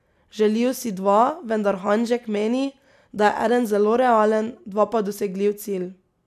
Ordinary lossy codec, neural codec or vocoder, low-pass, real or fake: none; none; 14.4 kHz; real